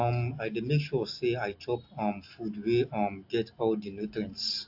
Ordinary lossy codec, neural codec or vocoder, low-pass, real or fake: AAC, 48 kbps; none; 5.4 kHz; real